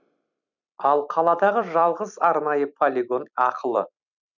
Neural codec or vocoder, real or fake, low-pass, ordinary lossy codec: vocoder, 44.1 kHz, 128 mel bands every 256 samples, BigVGAN v2; fake; 7.2 kHz; none